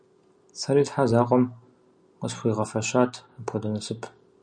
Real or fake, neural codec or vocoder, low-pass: real; none; 9.9 kHz